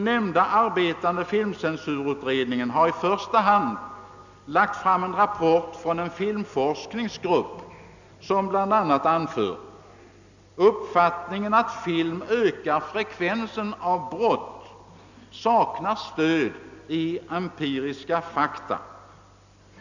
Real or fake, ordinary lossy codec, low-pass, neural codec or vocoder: real; none; 7.2 kHz; none